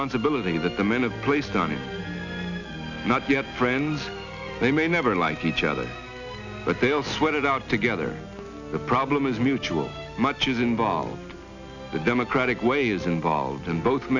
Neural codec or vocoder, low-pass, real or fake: none; 7.2 kHz; real